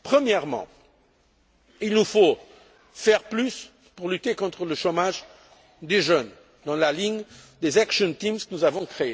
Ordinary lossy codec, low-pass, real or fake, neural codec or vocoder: none; none; real; none